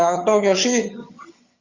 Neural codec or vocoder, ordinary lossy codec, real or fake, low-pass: vocoder, 22.05 kHz, 80 mel bands, HiFi-GAN; Opus, 64 kbps; fake; 7.2 kHz